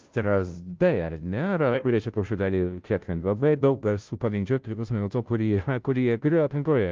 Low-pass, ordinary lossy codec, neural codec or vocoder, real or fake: 7.2 kHz; Opus, 24 kbps; codec, 16 kHz, 0.5 kbps, FunCodec, trained on Chinese and English, 25 frames a second; fake